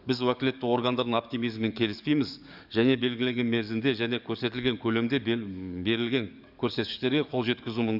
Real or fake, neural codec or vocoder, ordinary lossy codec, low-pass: fake; codec, 44.1 kHz, 7.8 kbps, DAC; none; 5.4 kHz